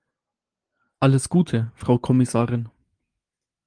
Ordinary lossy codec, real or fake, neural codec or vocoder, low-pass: Opus, 24 kbps; real; none; 9.9 kHz